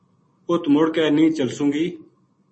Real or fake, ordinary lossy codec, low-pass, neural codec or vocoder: real; MP3, 32 kbps; 10.8 kHz; none